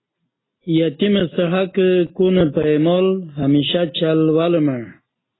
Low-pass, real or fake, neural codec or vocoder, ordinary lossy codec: 7.2 kHz; real; none; AAC, 16 kbps